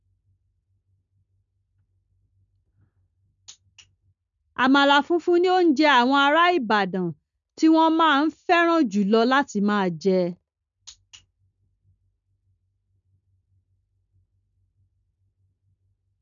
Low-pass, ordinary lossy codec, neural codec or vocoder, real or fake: 7.2 kHz; none; none; real